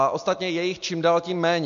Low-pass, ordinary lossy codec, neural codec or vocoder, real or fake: 7.2 kHz; MP3, 48 kbps; none; real